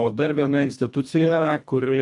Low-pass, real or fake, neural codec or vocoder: 10.8 kHz; fake; codec, 24 kHz, 1.5 kbps, HILCodec